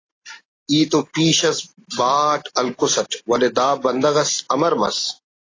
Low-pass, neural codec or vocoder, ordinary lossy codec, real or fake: 7.2 kHz; none; AAC, 32 kbps; real